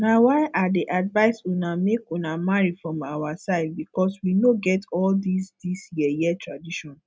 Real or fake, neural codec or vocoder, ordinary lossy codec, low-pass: real; none; none; none